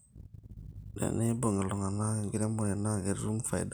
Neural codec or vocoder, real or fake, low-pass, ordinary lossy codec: none; real; none; none